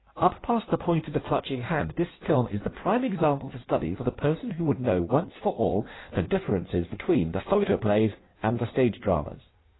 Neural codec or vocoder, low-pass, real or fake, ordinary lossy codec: codec, 16 kHz in and 24 kHz out, 1.1 kbps, FireRedTTS-2 codec; 7.2 kHz; fake; AAC, 16 kbps